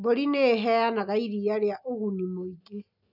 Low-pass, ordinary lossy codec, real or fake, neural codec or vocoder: 5.4 kHz; none; real; none